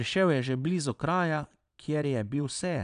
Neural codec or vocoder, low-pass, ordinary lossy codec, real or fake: vocoder, 22.05 kHz, 80 mel bands, Vocos; 9.9 kHz; none; fake